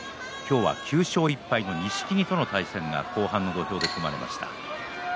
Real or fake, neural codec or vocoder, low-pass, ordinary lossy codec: real; none; none; none